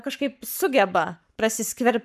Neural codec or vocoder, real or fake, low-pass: codec, 44.1 kHz, 7.8 kbps, Pupu-Codec; fake; 14.4 kHz